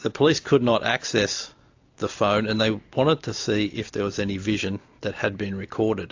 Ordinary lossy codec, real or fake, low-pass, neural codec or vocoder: AAC, 48 kbps; real; 7.2 kHz; none